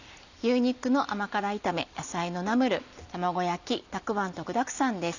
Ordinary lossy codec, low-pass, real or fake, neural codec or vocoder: none; 7.2 kHz; real; none